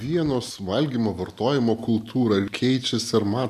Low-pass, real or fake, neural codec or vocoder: 14.4 kHz; real; none